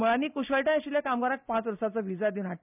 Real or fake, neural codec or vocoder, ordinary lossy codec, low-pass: real; none; none; 3.6 kHz